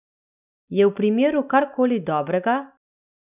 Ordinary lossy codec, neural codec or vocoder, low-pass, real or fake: none; none; 3.6 kHz; real